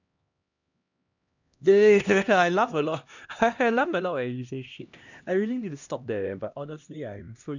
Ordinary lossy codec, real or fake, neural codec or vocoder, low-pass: none; fake; codec, 16 kHz, 1 kbps, X-Codec, HuBERT features, trained on LibriSpeech; 7.2 kHz